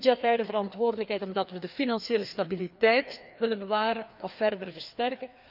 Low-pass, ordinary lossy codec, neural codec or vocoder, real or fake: 5.4 kHz; none; codec, 16 kHz, 2 kbps, FreqCodec, larger model; fake